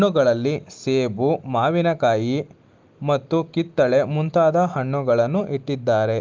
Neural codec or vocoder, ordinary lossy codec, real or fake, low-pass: none; Opus, 32 kbps; real; 7.2 kHz